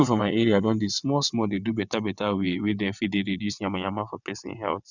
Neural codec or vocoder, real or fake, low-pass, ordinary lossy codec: vocoder, 22.05 kHz, 80 mel bands, WaveNeXt; fake; 7.2 kHz; none